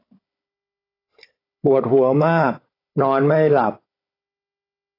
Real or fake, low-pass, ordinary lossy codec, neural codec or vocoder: fake; 5.4 kHz; AAC, 24 kbps; codec, 16 kHz, 16 kbps, FunCodec, trained on Chinese and English, 50 frames a second